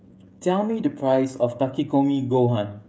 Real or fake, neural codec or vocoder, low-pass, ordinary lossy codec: fake; codec, 16 kHz, 16 kbps, FreqCodec, smaller model; none; none